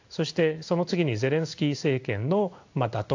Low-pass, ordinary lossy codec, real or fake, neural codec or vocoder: 7.2 kHz; AAC, 48 kbps; fake; codec, 16 kHz in and 24 kHz out, 1 kbps, XY-Tokenizer